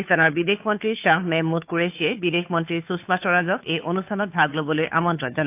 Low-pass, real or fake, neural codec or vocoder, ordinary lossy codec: 3.6 kHz; fake; codec, 16 kHz, 2 kbps, FunCodec, trained on Chinese and English, 25 frames a second; AAC, 32 kbps